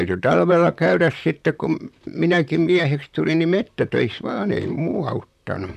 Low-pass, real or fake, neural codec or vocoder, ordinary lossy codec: 14.4 kHz; fake; vocoder, 44.1 kHz, 128 mel bands, Pupu-Vocoder; none